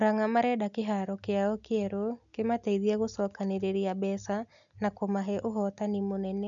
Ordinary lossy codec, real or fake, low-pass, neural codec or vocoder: none; real; 7.2 kHz; none